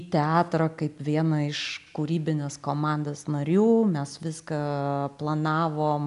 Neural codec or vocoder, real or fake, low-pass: none; real; 10.8 kHz